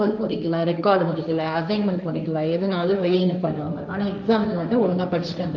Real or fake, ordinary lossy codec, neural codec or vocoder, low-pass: fake; none; codec, 16 kHz, 1.1 kbps, Voila-Tokenizer; none